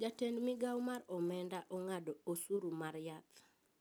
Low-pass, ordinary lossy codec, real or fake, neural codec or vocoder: none; none; real; none